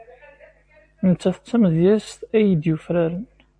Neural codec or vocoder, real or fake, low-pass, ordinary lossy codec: vocoder, 44.1 kHz, 128 mel bands every 512 samples, BigVGAN v2; fake; 9.9 kHz; AAC, 64 kbps